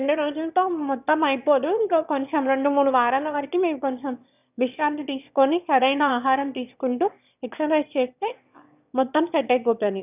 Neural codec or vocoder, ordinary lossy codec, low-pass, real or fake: autoencoder, 22.05 kHz, a latent of 192 numbers a frame, VITS, trained on one speaker; none; 3.6 kHz; fake